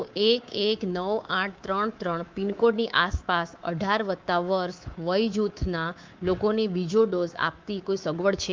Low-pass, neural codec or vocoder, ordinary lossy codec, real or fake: 7.2 kHz; codec, 24 kHz, 3.1 kbps, DualCodec; Opus, 24 kbps; fake